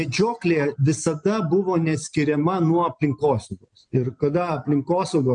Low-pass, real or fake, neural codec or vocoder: 9.9 kHz; real; none